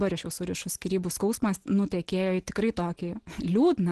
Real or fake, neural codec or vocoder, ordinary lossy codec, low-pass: real; none; Opus, 16 kbps; 10.8 kHz